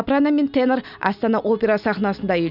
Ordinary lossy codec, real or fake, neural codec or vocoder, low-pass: none; real; none; 5.4 kHz